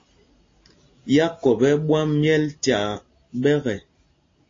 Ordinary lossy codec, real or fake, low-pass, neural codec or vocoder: AAC, 32 kbps; real; 7.2 kHz; none